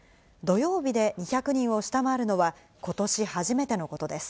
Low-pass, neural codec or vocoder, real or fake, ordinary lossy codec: none; none; real; none